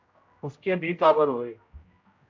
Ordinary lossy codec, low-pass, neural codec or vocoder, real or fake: MP3, 48 kbps; 7.2 kHz; codec, 16 kHz, 0.5 kbps, X-Codec, HuBERT features, trained on general audio; fake